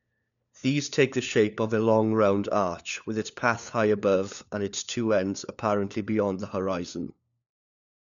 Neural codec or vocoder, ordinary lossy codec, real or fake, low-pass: codec, 16 kHz, 4 kbps, FunCodec, trained on LibriTTS, 50 frames a second; none; fake; 7.2 kHz